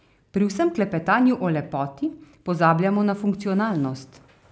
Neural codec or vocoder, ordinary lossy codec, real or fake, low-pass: none; none; real; none